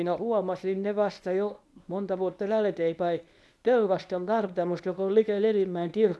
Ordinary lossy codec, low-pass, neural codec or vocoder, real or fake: none; none; codec, 24 kHz, 0.9 kbps, WavTokenizer, medium speech release version 1; fake